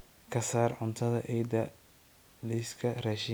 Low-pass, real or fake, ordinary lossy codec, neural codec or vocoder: none; real; none; none